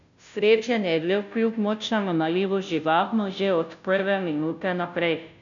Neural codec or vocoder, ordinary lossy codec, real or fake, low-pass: codec, 16 kHz, 0.5 kbps, FunCodec, trained on Chinese and English, 25 frames a second; none; fake; 7.2 kHz